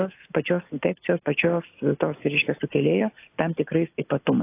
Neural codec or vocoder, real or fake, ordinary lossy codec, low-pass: none; real; AAC, 24 kbps; 3.6 kHz